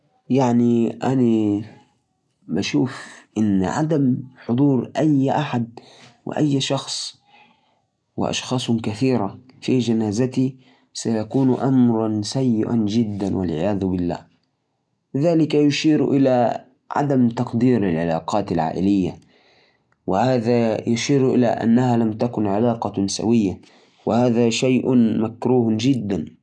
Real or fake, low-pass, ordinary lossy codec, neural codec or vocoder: real; none; none; none